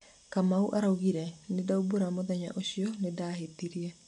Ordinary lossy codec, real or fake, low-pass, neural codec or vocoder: none; real; 10.8 kHz; none